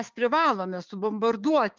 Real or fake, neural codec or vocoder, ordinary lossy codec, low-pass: fake; codec, 16 kHz, 6 kbps, DAC; Opus, 32 kbps; 7.2 kHz